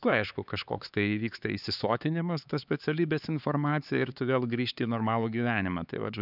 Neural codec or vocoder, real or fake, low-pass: codec, 16 kHz, 4 kbps, X-Codec, HuBERT features, trained on LibriSpeech; fake; 5.4 kHz